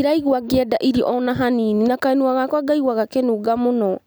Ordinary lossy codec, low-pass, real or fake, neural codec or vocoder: none; none; real; none